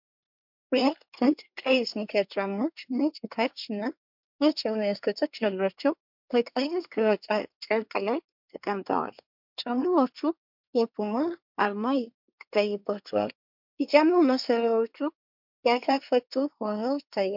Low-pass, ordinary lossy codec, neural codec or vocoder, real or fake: 5.4 kHz; MP3, 48 kbps; codec, 24 kHz, 1 kbps, SNAC; fake